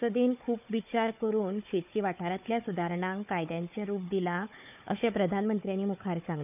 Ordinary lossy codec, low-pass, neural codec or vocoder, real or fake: none; 3.6 kHz; codec, 16 kHz, 4 kbps, FunCodec, trained on Chinese and English, 50 frames a second; fake